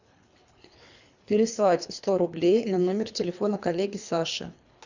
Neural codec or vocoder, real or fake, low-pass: codec, 24 kHz, 3 kbps, HILCodec; fake; 7.2 kHz